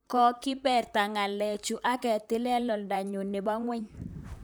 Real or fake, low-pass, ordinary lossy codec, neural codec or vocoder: fake; none; none; vocoder, 44.1 kHz, 128 mel bands, Pupu-Vocoder